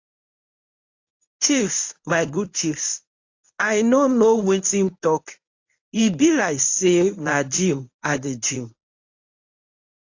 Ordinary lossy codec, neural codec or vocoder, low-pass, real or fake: AAC, 48 kbps; codec, 24 kHz, 0.9 kbps, WavTokenizer, medium speech release version 1; 7.2 kHz; fake